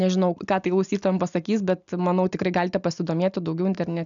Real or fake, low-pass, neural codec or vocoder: real; 7.2 kHz; none